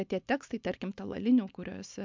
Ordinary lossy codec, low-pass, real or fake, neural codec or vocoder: MP3, 64 kbps; 7.2 kHz; real; none